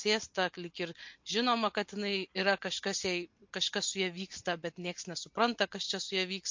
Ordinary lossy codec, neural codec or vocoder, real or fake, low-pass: MP3, 48 kbps; none; real; 7.2 kHz